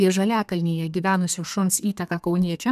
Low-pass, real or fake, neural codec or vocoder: 14.4 kHz; fake; codec, 32 kHz, 1.9 kbps, SNAC